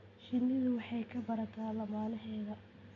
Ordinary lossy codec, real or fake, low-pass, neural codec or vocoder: none; real; 7.2 kHz; none